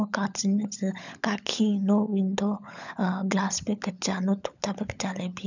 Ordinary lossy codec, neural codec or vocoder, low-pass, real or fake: none; codec, 16 kHz, 16 kbps, FunCodec, trained on LibriTTS, 50 frames a second; 7.2 kHz; fake